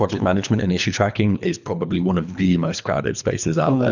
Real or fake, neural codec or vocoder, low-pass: fake; codec, 24 kHz, 3 kbps, HILCodec; 7.2 kHz